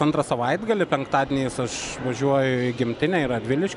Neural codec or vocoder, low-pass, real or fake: none; 10.8 kHz; real